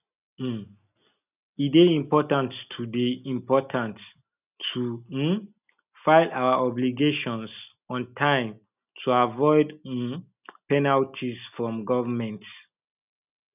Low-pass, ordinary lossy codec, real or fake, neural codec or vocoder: 3.6 kHz; none; real; none